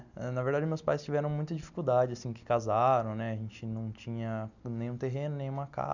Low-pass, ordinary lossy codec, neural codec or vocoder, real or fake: 7.2 kHz; none; none; real